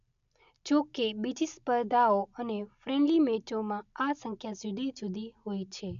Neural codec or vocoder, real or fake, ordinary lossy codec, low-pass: none; real; none; 7.2 kHz